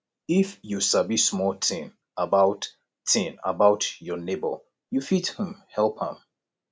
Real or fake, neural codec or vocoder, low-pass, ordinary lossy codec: real; none; none; none